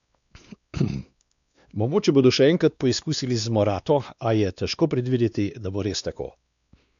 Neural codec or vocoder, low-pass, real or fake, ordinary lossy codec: codec, 16 kHz, 2 kbps, X-Codec, WavLM features, trained on Multilingual LibriSpeech; 7.2 kHz; fake; none